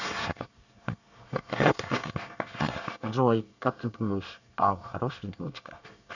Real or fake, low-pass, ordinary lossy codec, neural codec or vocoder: fake; 7.2 kHz; none; codec, 24 kHz, 1 kbps, SNAC